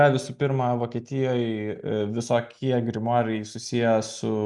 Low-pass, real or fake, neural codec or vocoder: 10.8 kHz; real; none